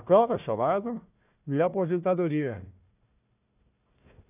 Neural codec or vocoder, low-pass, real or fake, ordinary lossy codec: codec, 16 kHz, 1 kbps, FunCodec, trained on Chinese and English, 50 frames a second; 3.6 kHz; fake; none